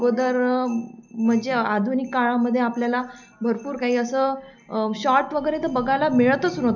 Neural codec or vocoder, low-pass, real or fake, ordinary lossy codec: none; 7.2 kHz; real; none